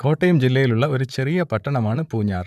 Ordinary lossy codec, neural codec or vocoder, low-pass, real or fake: none; vocoder, 44.1 kHz, 128 mel bands, Pupu-Vocoder; 14.4 kHz; fake